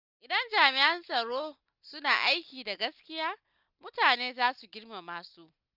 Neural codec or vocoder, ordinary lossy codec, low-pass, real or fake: none; none; 5.4 kHz; real